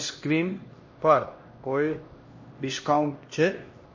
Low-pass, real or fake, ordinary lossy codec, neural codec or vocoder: 7.2 kHz; fake; MP3, 32 kbps; codec, 16 kHz, 1 kbps, X-Codec, HuBERT features, trained on LibriSpeech